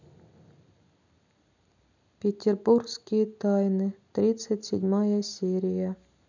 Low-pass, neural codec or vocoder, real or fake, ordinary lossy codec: 7.2 kHz; none; real; none